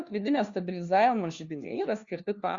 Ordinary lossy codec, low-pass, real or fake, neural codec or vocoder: Opus, 64 kbps; 7.2 kHz; fake; codec, 16 kHz, 1 kbps, FunCodec, trained on LibriTTS, 50 frames a second